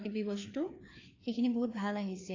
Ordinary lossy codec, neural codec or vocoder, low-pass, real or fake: AAC, 32 kbps; codec, 16 kHz, 2 kbps, FreqCodec, larger model; 7.2 kHz; fake